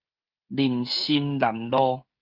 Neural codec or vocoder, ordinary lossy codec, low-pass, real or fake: codec, 16 kHz, 16 kbps, FreqCodec, smaller model; Opus, 24 kbps; 5.4 kHz; fake